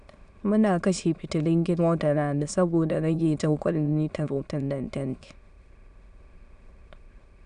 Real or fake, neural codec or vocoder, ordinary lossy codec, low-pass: fake; autoencoder, 22.05 kHz, a latent of 192 numbers a frame, VITS, trained on many speakers; Opus, 64 kbps; 9.9 kHz